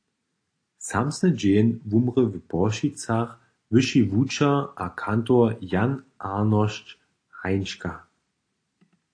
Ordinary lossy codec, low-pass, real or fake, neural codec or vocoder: AAC, 48 kbps; 9.9 kHz; real; none